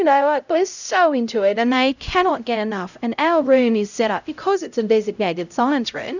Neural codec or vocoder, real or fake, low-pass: codec, 16 kHz, 0.5 kbps, X-Codec, HuBERT features, trained on LibriSpeech; fake; 7.2 kHz